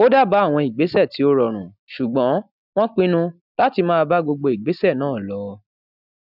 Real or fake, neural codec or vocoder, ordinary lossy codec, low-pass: real; none; none; 5.4 kHz